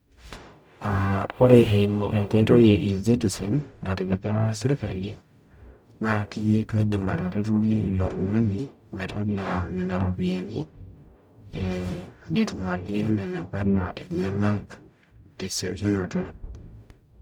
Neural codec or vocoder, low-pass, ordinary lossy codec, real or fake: codec, 44.1 kHz, 0.9 kbps, DAC; none; none; fake